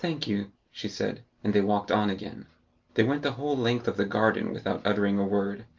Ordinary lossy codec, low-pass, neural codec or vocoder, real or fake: Opus, 32 kbps; 7.2 kHz; none; real